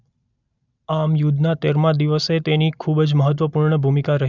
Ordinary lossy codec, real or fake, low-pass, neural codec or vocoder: none; real; 7.2 kHz; none